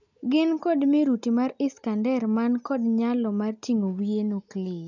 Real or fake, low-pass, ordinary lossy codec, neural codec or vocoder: real; 7.2 kHz; none; none